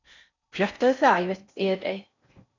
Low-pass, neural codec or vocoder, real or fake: 7.2 kHz; codec, 16 kHz in and 24 kHz out, 0.6 kbps, FocalCodec, streaming, 4096 codes; fake